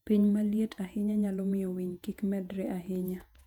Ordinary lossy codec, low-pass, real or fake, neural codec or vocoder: none; 19.8 kHz; fake; vocoder, 48 kHz, 128 mel bands, Vocos